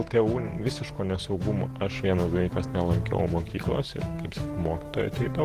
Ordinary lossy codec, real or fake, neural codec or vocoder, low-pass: Opus, 16 kbps; fake; autoencoder, 48 kHz, 128 numbers a frame, DAC-VAE, trained on Japanese speech; 14.4 kHz